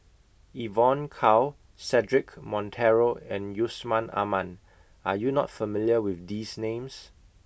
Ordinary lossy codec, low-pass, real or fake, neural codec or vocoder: none; none; real; none